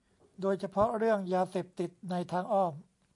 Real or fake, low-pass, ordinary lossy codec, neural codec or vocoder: real; 10.8 kHz; AAC, 64 kbps; none